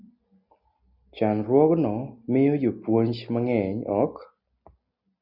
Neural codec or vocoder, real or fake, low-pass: none; real; 5.4 kHz